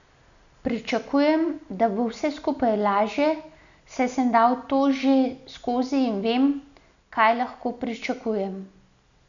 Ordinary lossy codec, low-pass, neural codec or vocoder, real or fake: none; 7.2 kHz; none; real